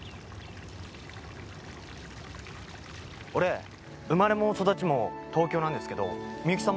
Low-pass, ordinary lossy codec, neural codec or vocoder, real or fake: none; none; none; real